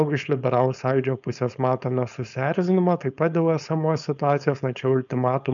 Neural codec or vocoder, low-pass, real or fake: codec, 16 kHz, 4.8 kbps, FACodec; 7.2 kHz; fake